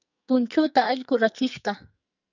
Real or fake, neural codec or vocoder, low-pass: fake; codec, 44.1 kHz, 2.6 kbps, SNAC; 7.2 kHz